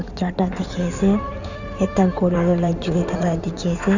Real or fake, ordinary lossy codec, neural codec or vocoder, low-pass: fake; none; codec, 16 kHz in and 24 kHz out, 2.2 kbps, FireRedTTS-2 codec; 7.2 kHz